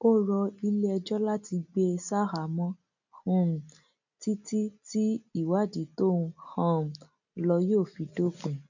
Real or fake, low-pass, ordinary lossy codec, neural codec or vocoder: real; 7.2 kHz; none; none